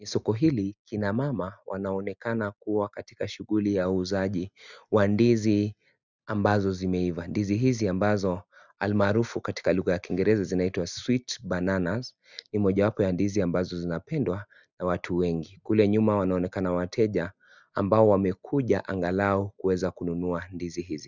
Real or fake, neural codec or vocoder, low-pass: real; none; 7.2 kHz